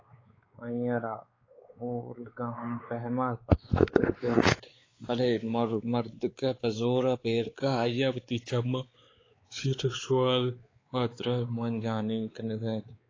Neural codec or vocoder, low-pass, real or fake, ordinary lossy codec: codec, 16 kHz, 4 kbps, X-Codec, WavLM features, trained on Multilingual LibriSpeech; 7.2 kHz; fake; AAC, 32 kbps